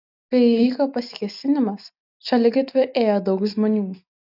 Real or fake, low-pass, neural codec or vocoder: real; 5.4 kHz; none